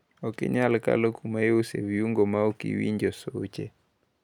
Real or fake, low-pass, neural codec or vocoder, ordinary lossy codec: real; 19.8 kHz; none; none